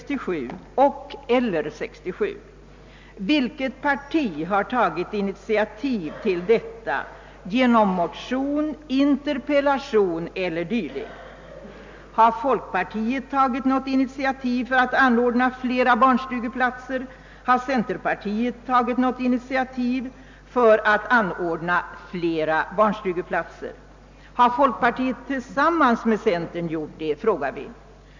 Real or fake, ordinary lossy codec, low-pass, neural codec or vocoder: real; MP3, 64 kbps; 7.2 kHz; none